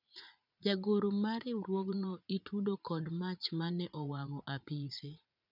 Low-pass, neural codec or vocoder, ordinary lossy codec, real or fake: 5.4 kHz; vocoder, 44.1 kHz, 80 mel bands, Vocos; none; fake